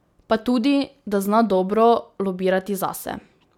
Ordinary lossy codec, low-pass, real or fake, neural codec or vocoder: none; 19.8 kHz; real; none